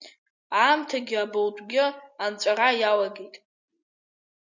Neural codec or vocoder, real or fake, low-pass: none; real; 7.2 kHz